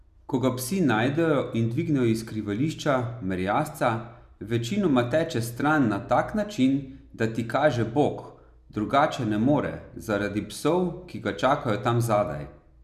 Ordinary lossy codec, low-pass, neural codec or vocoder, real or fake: none; 14.4 kHz; none; real